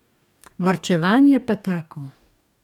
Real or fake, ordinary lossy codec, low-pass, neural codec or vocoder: fake; none; 19.8 kHz; codec, 44.1 kHz, 2.6 kbps, DAC